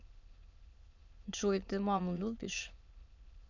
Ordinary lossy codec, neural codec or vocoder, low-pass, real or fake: none; autoencoder, 22.05 kHz, a latent of 192 numbers a frame, VITS, trained on many speakers; 7.2 kHz; fake